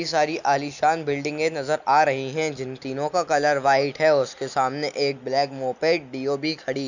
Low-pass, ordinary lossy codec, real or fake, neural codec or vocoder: 7.2 kHz; none; real; none